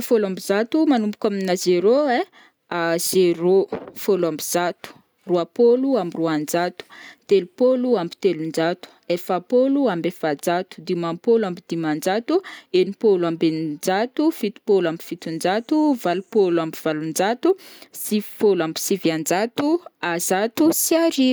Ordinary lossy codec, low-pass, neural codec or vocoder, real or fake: none; none; none; real